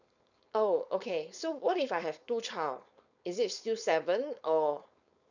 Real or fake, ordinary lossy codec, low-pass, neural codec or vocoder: fake; none; 7.2 kHz; codec, 16 kHz, 4.8 kbps, FACodec